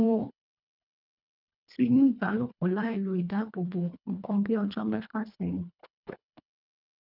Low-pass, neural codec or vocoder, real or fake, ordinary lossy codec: 5.4 kHz; codec, 24 kHz, 1.5 kbps, HILCodec; fake; none